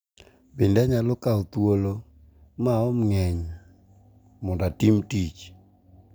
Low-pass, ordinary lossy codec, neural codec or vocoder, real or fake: none; none; none; real